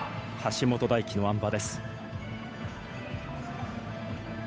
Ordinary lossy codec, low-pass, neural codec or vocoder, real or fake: none; none; codec, 16 kHz, 8 kbps, FunCodec, trained on Chinese and English, 25 frames a second; fake